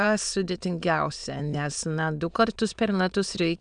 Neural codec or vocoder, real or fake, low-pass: autoencoder, 22.05 kHz, a latent of 192 numbers a frame, VITS, trained on many speakers; fake; 9.9 kHz